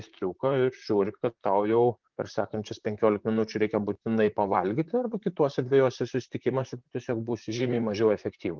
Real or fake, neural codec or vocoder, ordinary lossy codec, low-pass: fake; vocoder, 44.1 kHz, 128 mel bands, Pupu-Vocoder; Opus, 24 kbps; 7.2 kHz